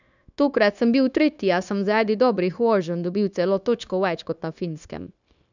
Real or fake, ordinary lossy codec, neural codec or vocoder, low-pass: fake; none; codec, 16 kHz, 0.9 kbps, LongCat-Audio-Codec; 7.2 kHz